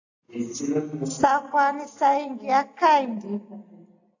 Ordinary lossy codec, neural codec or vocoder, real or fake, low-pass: AAC, 48 kbps; none; real; 7.2 kHz